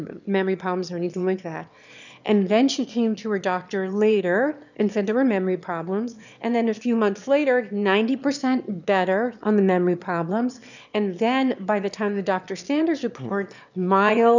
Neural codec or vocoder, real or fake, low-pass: autoencoder, 22.05 kHz, a latent of 192 numbers a frame, VITS, trained on one speaker; fake; 7.2 kHz